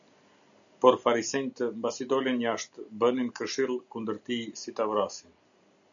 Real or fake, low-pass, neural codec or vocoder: real; 7.2 kHz; none